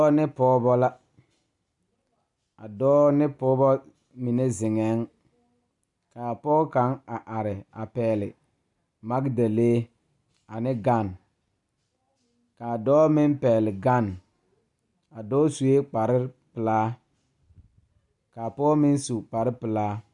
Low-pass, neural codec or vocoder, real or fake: 10.8 kHz; none; real